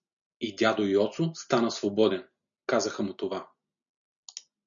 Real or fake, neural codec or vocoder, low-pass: real; none; 7.2 kHz